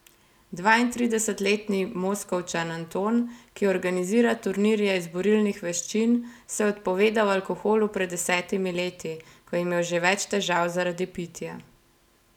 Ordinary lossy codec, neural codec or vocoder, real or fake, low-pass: none; none; real; 19.8 kHz